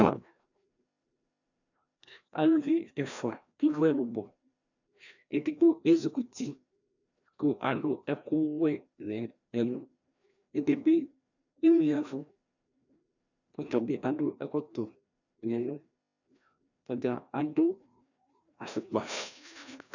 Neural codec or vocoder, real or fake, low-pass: codec, 16 kHz, 1 kbps, FreqCodec, larger model; fake; 7.2 kHz